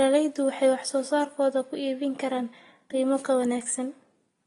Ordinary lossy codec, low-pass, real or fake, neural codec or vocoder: AAC, 32 kbps; 10.8 kHz; real; none